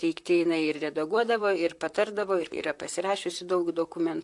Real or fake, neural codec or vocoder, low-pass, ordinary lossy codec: fake; vocoder, 44.1 kHz, 128 mel bands, Pupu-Vocoder; 10.8 kHz; AAC, 64 kbps